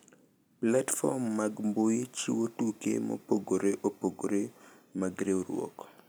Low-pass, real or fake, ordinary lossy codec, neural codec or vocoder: none; real; none; none